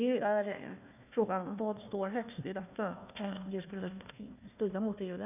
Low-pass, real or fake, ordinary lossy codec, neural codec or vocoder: 3.6 kHz; fake; none; codec, 16 kHz, 1 kbps, FunCodec, trained on Chinese and English, 50 frames a second